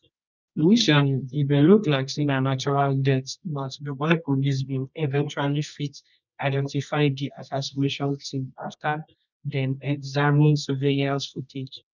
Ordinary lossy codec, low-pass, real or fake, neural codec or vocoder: none; 7.2 kHz; fake; codec, 24 kHz, 0.9 kbps, WavTokenizer, medium music audio release